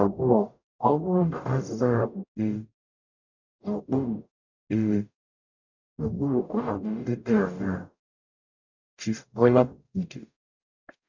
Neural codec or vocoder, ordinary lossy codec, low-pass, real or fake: codec, 44.1 kHz, 0.9 kbps, DAC; none; 7.2 kHz; fake